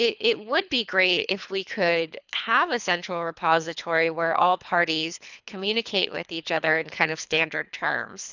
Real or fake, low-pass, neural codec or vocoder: fake; 7.2 kHz; codec, 24 kHz, 3 kbps, HILCodec